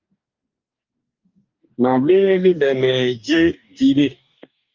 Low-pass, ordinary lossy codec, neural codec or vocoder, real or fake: 7.2 kHz; Opus, 32 kbps; codec, 44.1 kHz, 2.6 kbps, DAC; fake